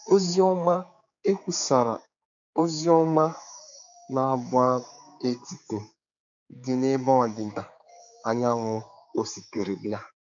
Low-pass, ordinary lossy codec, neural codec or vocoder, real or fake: 7.2 kHz; AAC, 64 kbps; codec, 16 kHz, 4 kbps, X-Codec, HuBERT features, trained on general audio; fake